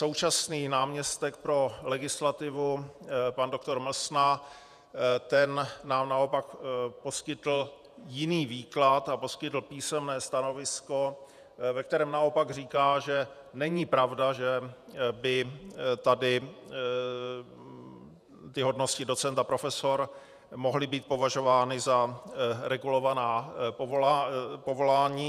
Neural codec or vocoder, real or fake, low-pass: vocoder, 48 kHz, 128 mel bands, Vocos; fake; 14.4 kHz